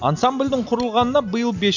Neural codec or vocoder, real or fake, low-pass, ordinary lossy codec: none; real; 7.2 kHz; none